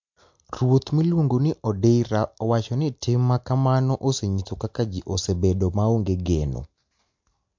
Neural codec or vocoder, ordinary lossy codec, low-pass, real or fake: none; MP3, 48 kbps; 7.2 kHz; real